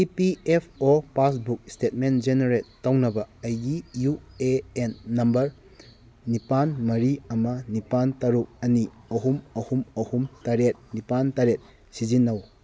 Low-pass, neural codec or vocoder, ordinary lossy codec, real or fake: none; none; none; real